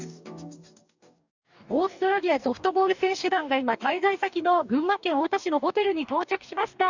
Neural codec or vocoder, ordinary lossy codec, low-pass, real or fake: codec, 44.1 kHz, 2.6 kbps, DAC; none; 7.2 kHz; fake